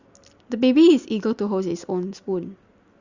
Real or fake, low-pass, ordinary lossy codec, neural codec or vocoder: real; 7.2 kHz; Opus, 64 kbps; none